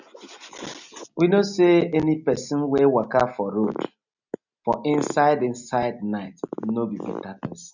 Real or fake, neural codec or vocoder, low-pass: real; none; 7.2 kHz